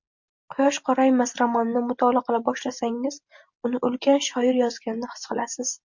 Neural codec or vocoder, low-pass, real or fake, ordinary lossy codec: none; 7.2 kHz; real; MP3, 48 kbps